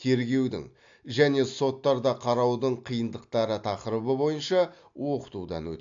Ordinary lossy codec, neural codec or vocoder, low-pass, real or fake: AAC, 64 kbps; none; 7.2 kHz; real